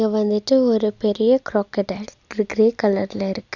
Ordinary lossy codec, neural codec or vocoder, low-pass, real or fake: Opus, 64 kbps; none; 7.2 kHz; real